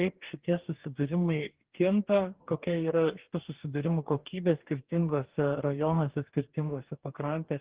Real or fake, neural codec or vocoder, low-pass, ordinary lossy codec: fake; codec, 44.1 kHz, 2.6 kbps, DAC; 3.6 kHz; Opus, 16 kbps